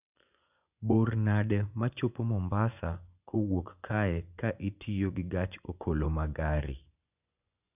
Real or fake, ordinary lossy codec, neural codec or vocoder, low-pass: fake; none; vocoder, 24 kHz, 100 mel bands, Vocos; 3.6 kHz